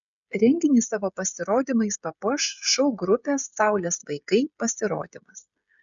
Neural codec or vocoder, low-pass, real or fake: codec, 16 kHz, 16 kbps, FreqCodec, smaller model; 7.2 kHz; fake